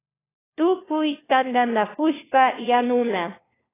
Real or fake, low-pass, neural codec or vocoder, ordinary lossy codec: fake; 3.6 kHz; codec, 16 kHz, 1 kbps, FunCodec, trained on LibriTTS, 50 frames a second; AAC, 16 kbps